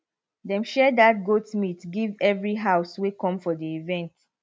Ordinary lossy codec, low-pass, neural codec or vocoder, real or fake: none; none; none; real